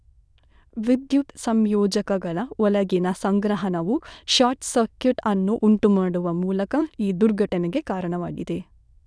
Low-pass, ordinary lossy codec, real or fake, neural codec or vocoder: none; none; fake; autoencoder, 22.05 kHz, a latent of 192 numbers a frame, VITS, trained on many speakers